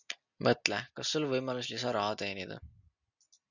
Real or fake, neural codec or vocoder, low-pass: real; none; 7.2 kHz